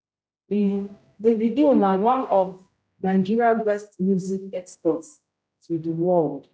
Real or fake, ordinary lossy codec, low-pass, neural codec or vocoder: fake; none; none; codec, 16 kHz, 0.5 kbps, X-Codec, HuBERT features, trained on general audio